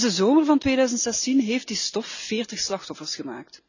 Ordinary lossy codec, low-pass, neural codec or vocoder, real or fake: none; 7.2 kHz; none; real